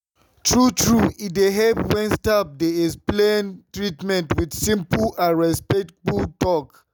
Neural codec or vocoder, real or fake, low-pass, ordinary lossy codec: none; real; none; none